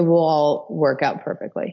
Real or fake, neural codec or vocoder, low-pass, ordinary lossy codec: real; none; 7.2 kHz; MP3, 48 kbps